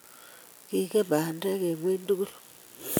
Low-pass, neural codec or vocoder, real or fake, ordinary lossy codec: none; none; real; none